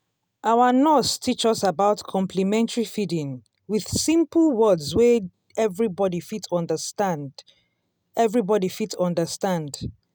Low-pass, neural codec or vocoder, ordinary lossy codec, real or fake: none; none; none; real